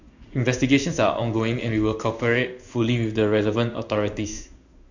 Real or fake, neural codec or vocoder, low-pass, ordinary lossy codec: fake; codec, 16 kHz in and 24 kHz out, 1 kbps, XY-Tokenizer; 7.2 kHz; none